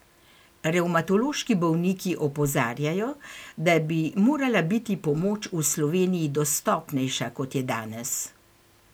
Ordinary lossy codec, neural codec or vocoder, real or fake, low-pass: none; none; real; none